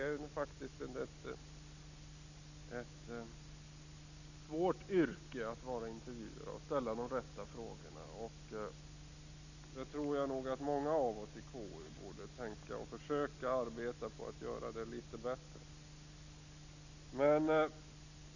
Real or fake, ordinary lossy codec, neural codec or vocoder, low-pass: real; none; none; 7.2 kHz